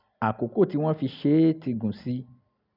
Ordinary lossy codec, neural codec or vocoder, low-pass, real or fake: none; none; 5.4 kHz; real